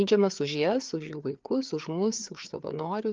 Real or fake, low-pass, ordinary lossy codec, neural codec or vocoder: fake; 7.2 kHz; Opus, 32 kbps; codec, 16 kHz, 4 kbps, FreqCodec, larger model